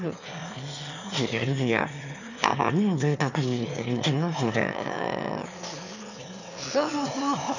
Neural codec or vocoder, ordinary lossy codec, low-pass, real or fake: autoencoder, 22.05 kHz, a latent of 192 numbers a frame, VITS, trained on one speaker; none; 7.2 kHz; fake